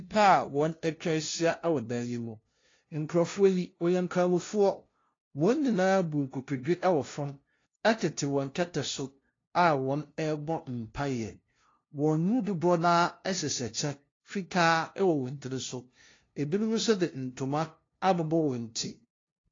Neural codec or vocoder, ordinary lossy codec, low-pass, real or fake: codec, 16 kHz, 0.5 kbps, FunCodec, trained on LibriTTS, 25 frames a second; AAC, 32 kbps; 7.2 kHz; fake